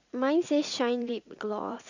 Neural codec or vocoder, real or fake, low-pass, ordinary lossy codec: vocoder, 44.1 kHz, 80 mel bands, Vocos; fake; 7.2 kHz; none